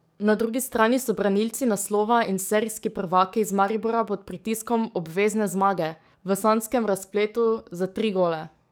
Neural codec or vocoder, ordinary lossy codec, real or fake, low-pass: codec, 44.1 kHz, 7.8 kbps, DAC; none; fake; none